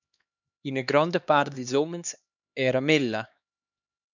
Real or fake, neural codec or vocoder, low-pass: fake; codec, 16 kHz, 2 kbps, X-Codec, HuBERT features, trained on LibriSpeech; 7.2 kHz